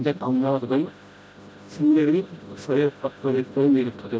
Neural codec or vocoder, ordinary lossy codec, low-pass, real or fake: codec, 16 kHz, 0.5 kbps, FreqCodec, smaller model; none; none; fake